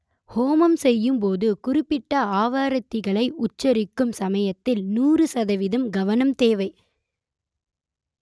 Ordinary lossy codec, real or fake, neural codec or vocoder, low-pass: none; real; none; none